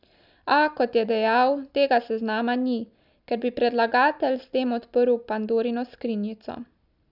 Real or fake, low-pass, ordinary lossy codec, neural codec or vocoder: real; 5.4 kHz; none; none